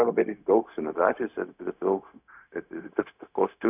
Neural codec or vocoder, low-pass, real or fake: codec, 16 kHz, 0.4 kbps, LongCat-Audio-Codec; 3.6 kHz; fake